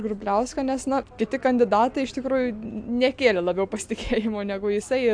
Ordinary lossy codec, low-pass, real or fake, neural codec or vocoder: AAC, 64 kbps; 9.9 kHz; real; none